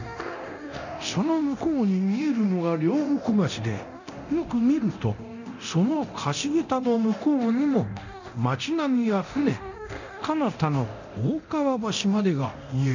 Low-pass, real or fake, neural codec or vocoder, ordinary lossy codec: 7.2 kHz; fake; codec, 24 kHz, 0.9 kbps, DualCodec; none